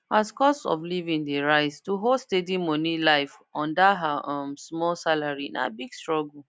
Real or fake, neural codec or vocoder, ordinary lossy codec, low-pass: real; none; none; none